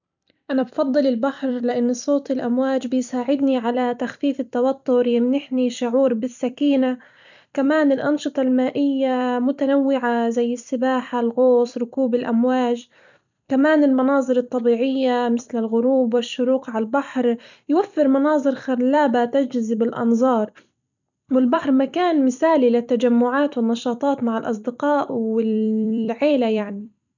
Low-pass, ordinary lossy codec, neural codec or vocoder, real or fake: 7.2 kHz; none; vocoder, 44.1 kHz, 128 mel bands every 256 samples, BigVGAN v2; fake